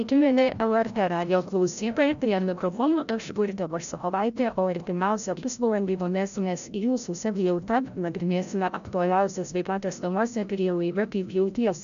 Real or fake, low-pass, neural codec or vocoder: fake; 7.2 kHz; codec, 16 kHz, 0.5 kbps, FreqCodec, larger model